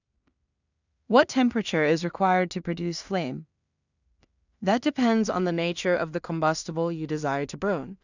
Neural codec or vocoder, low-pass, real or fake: codec, 16 kHz in and 24 kHz out, 0.4 kbps, LongCat-Audio-Codec, two codebook decoder; 7.2 kHz; fake